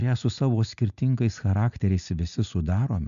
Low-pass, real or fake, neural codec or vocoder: 7.2 kHz; real; none